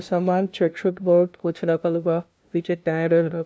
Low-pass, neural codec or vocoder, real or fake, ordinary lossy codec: none; codec, 16 kHz, 0.5 kbps, FunCodec, trained on LibriTTS, 25 frames a second; fake; none